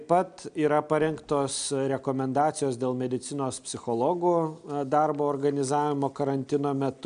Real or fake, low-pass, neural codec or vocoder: real; 9.9 kHz; none